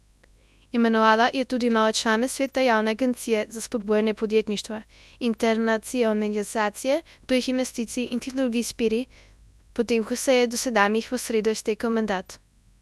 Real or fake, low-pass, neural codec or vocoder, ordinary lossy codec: fake; none; codec, 24 kHz, 0.9 kbps, WavTokenizer, large speech release; none